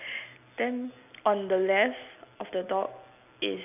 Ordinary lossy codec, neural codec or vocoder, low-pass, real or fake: none; none; 3.6 kHz; real